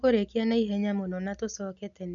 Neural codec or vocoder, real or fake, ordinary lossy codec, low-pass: none; real; none; 7.2 kHz